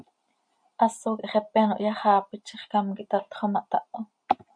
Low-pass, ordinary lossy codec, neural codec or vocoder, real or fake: 9.9 kHz; MP3, 48 kbps; none; real